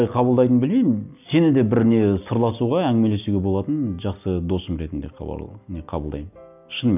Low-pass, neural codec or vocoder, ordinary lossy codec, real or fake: 3.6 kHz; none; none; real